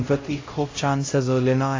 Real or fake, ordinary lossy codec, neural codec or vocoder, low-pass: fake; AAC, 32 kbps; codec, 16 kHz, 0.5 kbps, X-Codec, HuBERT features, trained on LibriSpeech; 7.2 kHz